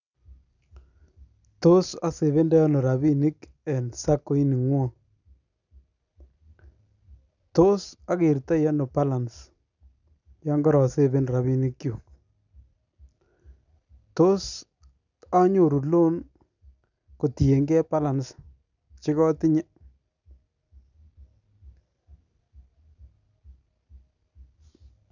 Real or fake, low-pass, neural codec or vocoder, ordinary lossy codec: real; 7.2 kHz; none; none